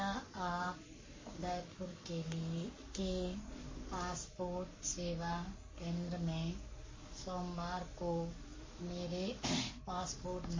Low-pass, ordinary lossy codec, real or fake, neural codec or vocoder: 7.2 kHz; MP3, 32 kbps; fake; codec, 44.1 kHz, 7.8 kbps, Pupu-Codec